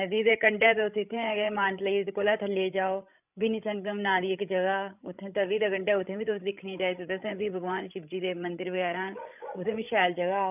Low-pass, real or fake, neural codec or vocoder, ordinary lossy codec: 3.6 kHz; fake; codec, 16 kHz, 16 kbps, FreqCodec, larger model; none